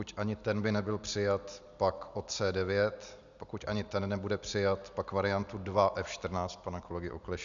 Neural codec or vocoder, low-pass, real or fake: none; 7.2 kHz; real